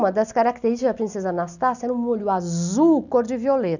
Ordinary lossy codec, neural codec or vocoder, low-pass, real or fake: none; none; 7.2 kHz; real